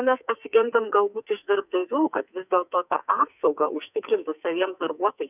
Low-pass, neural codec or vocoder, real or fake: 3.6 kHz; codec, 44.1 kHz, 2.6 kbps, SNAC; fake